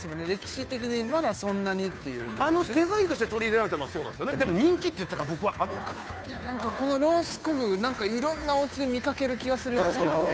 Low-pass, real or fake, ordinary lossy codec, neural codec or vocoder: none; fake; none; codec, 16 kHz, 2 kbps, FunCodec, trained on Chinese and English, 25 frames a second